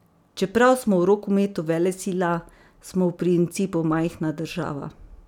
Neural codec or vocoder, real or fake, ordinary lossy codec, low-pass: none; real; none; 19.8 kHz